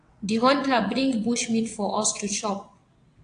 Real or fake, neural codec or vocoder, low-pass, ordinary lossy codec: fake; vocoder, 22.05 kHz, 80 mel bands, WaveNeXt; 9.9 kHz; AAC, 48 kbps